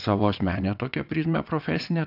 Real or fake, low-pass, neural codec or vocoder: fake; 5.4 kHz; vocoder, 22.05 kHz, 80 mel bands, WaveNeXt